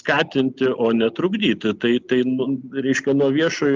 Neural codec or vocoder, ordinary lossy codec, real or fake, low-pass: none; Opus, 32 kbps; real; 7.2 kHz